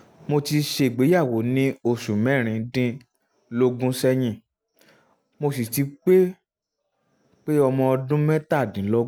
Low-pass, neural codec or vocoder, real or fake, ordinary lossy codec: none; none; real; none